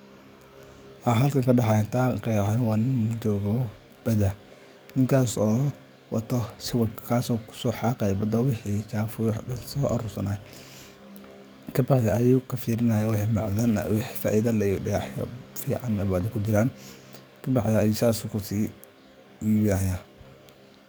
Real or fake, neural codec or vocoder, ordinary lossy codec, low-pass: fake; codec, 44.1 kHz, 7.8 kbps, DAC; none; none